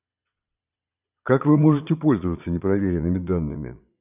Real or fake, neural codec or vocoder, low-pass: fake; vocoder, 22.05 kHz, 80 mel bands, Vocos; 3.6 kHz